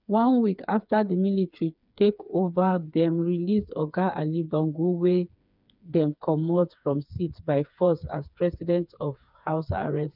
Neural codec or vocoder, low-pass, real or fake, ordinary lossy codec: codec, 16 kHz, 4 kbps, FreqCodec, smaller model; 5.4 kHz; fake; none